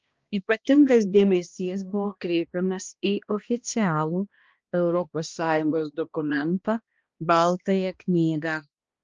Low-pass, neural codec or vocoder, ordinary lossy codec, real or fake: 7.2 kHz; codec, 16 kHz, 1 kbps, X-Codec, HuBERT features, trained on balanced general audio; Opus, 24 kbps; fake